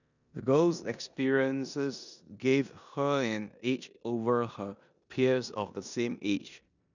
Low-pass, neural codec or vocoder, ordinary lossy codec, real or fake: 7.2 kHz; codec, 16 kHz in and 24 kHz out, 0.9 kbps, LongCat-Audio-Codec, four codebook decoder; none; fake